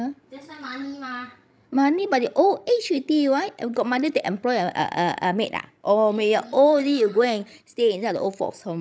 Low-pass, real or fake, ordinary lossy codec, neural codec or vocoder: none; fake; none; codec, 16 kHz, 16 kbps, FreqCodec, larger model